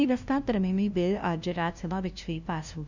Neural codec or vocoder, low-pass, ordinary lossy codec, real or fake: codec, 16 kHz, 0.5 kbps, FunCodec, trained on LibriTTS, 25 frames a second; 7.2 kHz; none; fake